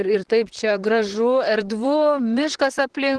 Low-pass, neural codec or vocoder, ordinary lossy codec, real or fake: 10.8 kHz; vocoder, 44.1 kHz, 128 mel bands, Pupu-Vocoder; Opus, 16 kbps; fake